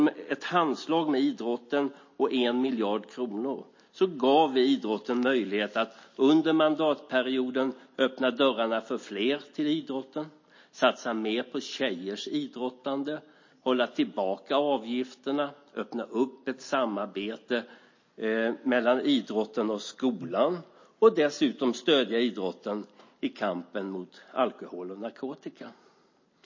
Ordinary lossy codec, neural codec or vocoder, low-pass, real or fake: MP3, 32 kbps; none; 7.2 kHz; real